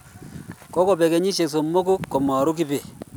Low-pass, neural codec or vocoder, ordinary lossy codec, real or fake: none; none; none; real